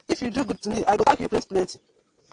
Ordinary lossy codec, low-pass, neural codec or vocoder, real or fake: AAC, 48 kbps; 9.9 kHz; vocoder, 22.05 kHz, 80 mel bands, WaveNeXt; fake